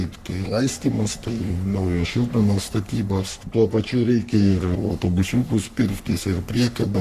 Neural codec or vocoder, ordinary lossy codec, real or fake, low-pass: codec, 44.1 kHz, 3.4 kbps, Pupu-Codec; Opus, 64 kbps; fake; 14.4 kHz